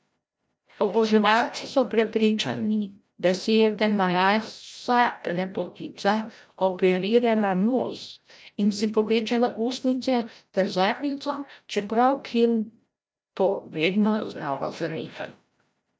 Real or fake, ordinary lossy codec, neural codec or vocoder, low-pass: fake; none; codec, 16 kHz, 0.5 kbps, FreqCodec, larger model; none